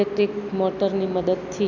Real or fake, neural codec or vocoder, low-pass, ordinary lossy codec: real; none; 7.2 kHz; none